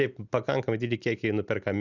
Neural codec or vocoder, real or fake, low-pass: none; real; 7.2 kHz